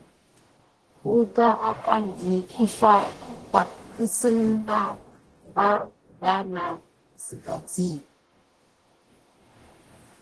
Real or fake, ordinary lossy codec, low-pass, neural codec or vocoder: fake; Opus, 16 kbps; 10.8 kHz; codec, 44.1 kHz, 0.9 kbps, DAC